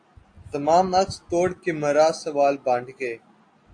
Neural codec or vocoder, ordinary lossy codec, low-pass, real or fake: none; AAC, 48 kbps; 9.9 kHz; real